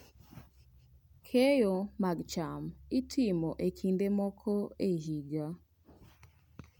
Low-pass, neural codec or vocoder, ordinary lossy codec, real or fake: 19.8 kHz; none; none; real